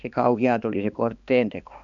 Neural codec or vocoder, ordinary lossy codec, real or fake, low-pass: codec, 16 kHz, 2 kbps, X-Codec, HuBERT features, trained on balanced general audio; Opus, 64 kbps; fake; 7.2 kHz